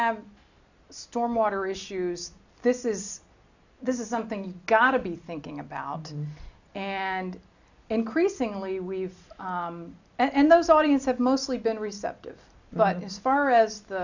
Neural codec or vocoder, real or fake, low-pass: none; real; 7.2 kHz